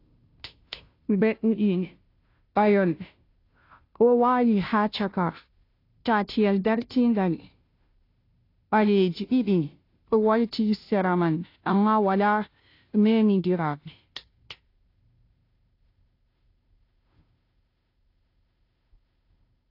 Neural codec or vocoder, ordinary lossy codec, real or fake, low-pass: codec, 16 kHz, 0.5 kbps, FunCodec, trained on Chinese and English, 25 frames a second; AAC, 32 kbps; fake; 5.4 kHz